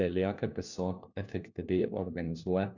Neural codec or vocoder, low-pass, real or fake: codec, 16 kHz, 1 kbps, FunCodec, trained on LibriTTS, 50 frames a second; 7.2 kHz; fake